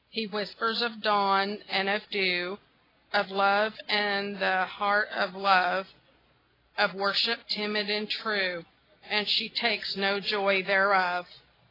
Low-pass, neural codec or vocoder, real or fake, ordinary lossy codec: 5.4 kHz; none; real; AAC, 24 kbps